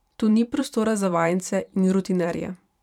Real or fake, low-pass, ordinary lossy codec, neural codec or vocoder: fake; 19.8 kHz; none; vocoder, 44.1 kHz, 128 mel bands every 512 samples, BigVGAN v2